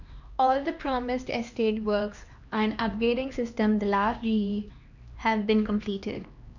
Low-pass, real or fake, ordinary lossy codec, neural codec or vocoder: 7.2 kHz; fake; none; codec, 16 kHz, 2 kbps, X-Codec, HuBERT features, trained on LibriSpeech